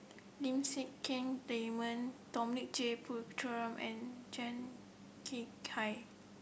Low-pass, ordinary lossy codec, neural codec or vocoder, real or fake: none; none; none; real